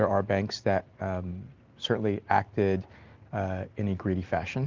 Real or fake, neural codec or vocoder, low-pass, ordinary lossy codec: real; none; 7.2 kHz; Opus, 24 kbps